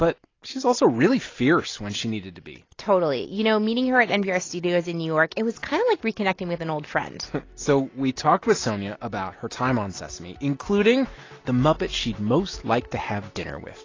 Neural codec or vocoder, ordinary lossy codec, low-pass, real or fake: none; AAC, 32 kbps; 7.2 kHz; real